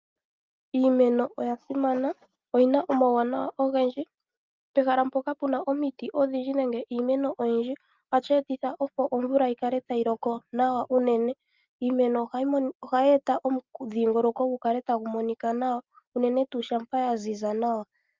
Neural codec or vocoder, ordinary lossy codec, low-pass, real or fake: none; Opus, 32 kbps; 7.2 kHz; real